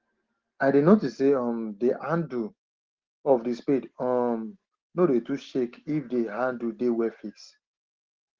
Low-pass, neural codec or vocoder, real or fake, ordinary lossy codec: 7.2 kHz; none; real; Opus, 16 kbps